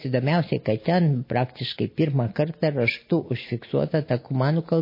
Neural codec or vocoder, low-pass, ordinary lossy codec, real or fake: none; 5.4 kHz; MP3, 24 kbps; real